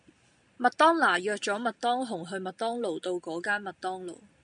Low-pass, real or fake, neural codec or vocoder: 9.9 kHz; real; none